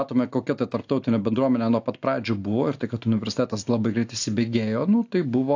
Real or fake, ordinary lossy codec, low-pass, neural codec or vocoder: real; AAC, 48 kbps; 7.2 kHz; none